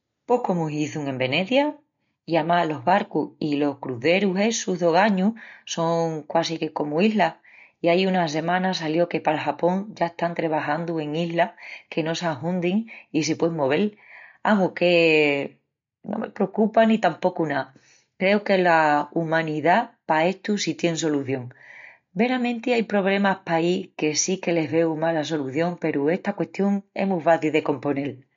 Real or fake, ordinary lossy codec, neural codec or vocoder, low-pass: real; MP3, 48 kbps; none; 7.2 kHz